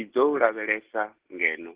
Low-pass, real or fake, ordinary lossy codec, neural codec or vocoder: 3.6 kHz; real; Opus, 16 kbps; none